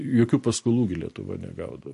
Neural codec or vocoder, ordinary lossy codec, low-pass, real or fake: none; MP3, 48 kbps; 14.4 kHz; real